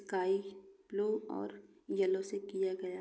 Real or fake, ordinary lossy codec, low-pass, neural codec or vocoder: real; none; none; none